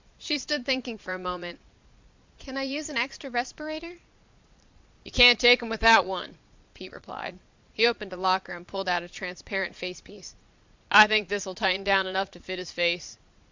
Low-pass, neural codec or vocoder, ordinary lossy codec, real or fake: 7.2 kHz; vocoder, 22.05 kHz, 80 mel bands, Vocos; MP3, 64 kbps; fake